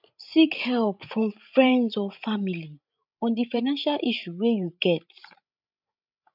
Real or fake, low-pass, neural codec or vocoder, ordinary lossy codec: fake; 5.4 kHz; codec, 16 kHz, 16 kbps, FreqCodec, larger model; none